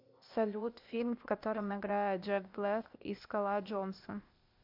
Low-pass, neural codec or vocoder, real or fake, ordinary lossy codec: 5.4 kHz; codec, 16 kHz, 0.8 kbps, ZipCodec; fake; MP3, 48 kbps